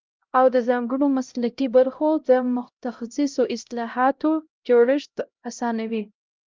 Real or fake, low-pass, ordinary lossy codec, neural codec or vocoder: fake; 7.2 kHz; Opus, 24 kbps; codec, 16 kHz, 0.5 kbps, X-Codec, HuBERT features, trained on LibriSpeech